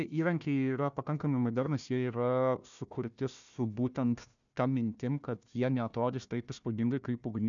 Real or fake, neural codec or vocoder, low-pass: fake; codec, 16 kHz, 1 kbps, FunCodec, trained on Chinese and English, 50 frames a second; 7.2 kHz